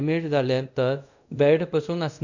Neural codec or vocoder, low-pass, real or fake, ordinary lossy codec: codec, 24 kHz, 0.5 kbps, DualCodec; 7.2 kHz; fake; none